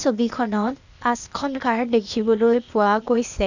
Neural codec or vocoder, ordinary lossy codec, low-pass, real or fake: codec, 16 kHz, 0.8 kbps, ZipCodec; none; 7.2 kHz; fake